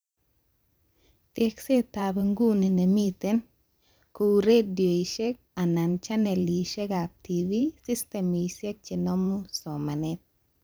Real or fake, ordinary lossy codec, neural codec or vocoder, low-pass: fake; none; vocoder, 44.1 kHz, 128 mel bands every 512 samples, BigVGAN v2; none